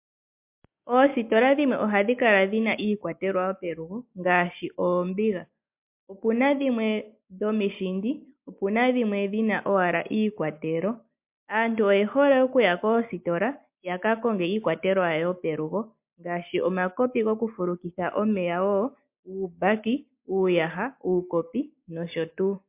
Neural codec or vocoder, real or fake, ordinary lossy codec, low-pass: none; real; MP3, 32 kbps; 3.6 kHz